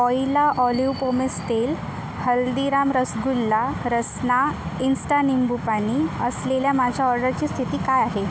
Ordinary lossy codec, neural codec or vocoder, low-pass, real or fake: none; none; none; real